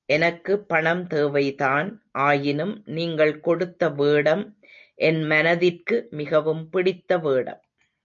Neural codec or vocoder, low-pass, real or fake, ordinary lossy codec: none; 7.2 kHz; real; MP3, 48 kbps